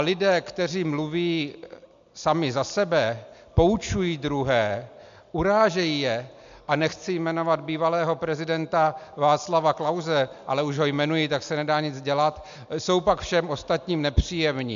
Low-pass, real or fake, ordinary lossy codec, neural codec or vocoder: 7.2 kHz; real; MP3, 64 kbps; none